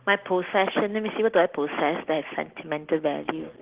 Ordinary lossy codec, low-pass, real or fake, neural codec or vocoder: Opus, 16 kbps; 3.6 kHz; real; none